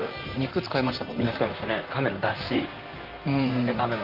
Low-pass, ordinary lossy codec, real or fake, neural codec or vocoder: 5.4 kHz; Opus, 32 kbps; fake; vocoder, 44.1 kHz, 128 mel bands, Pupu-Vocoder